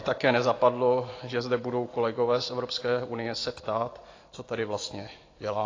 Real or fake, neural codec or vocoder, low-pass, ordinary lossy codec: real; none; 7.2 kHz; AAC, 32 kbps